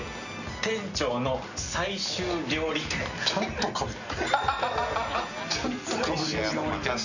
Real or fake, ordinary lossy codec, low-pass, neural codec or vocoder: real; none; 7.2 kHz; none